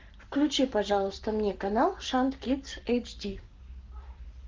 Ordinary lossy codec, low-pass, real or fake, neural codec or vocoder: Opus, 32 kbps; 7.2 kHz; fake; codec, 44.1 kHz, 7.8 kbps, Pupu-Codec